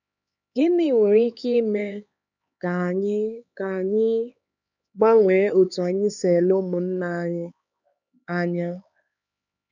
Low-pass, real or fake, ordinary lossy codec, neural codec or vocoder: 7.2 kHz; fake; none; codec, 16 kHz, 4 kbps, X-Codec, HuBERT features, trained on LibriSpeech